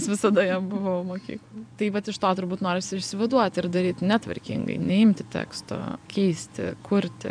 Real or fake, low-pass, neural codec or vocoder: fake; 9.9 kHz; vocoder, 24 kHz, 100 mel bands, Vocos